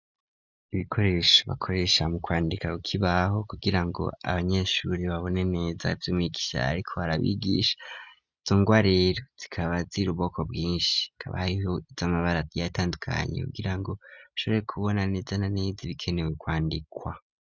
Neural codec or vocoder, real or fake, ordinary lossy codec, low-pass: none; real; Opus, 64 kbps; 7.2 kHz